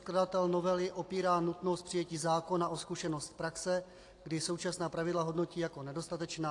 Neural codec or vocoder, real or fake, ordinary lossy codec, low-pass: none; real; AAC, 48 kbps; 10.8 kHz